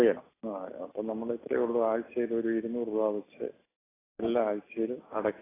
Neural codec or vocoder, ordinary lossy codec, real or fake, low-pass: none; AAC, 16 kbps; real; 3.6 kHz